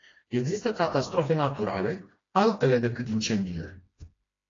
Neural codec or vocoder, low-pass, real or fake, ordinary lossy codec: codec, 16 kHz, 1 kbps, FreqCodec, smaller model; 7.2 kHz; fake; AAC, 32 kbps